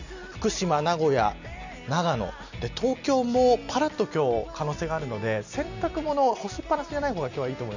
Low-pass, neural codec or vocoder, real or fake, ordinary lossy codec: 7.2 kHz; none; real; none